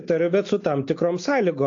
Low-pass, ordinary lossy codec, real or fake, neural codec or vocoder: 7.2 kHz; AAC, 48 kbps; real; none